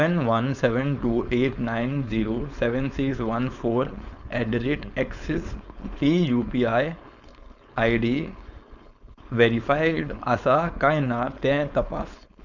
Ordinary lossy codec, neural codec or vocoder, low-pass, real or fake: none; codec, 16 kHz, 4.8 kbps, FACodec; 7.2 kHz; fake